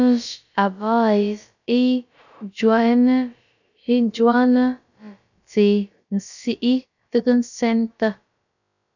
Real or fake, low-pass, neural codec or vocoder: fake; 7.2 kHz; codec, 16 kHz, about 1 kbps, DyCAST, with the encoder's durations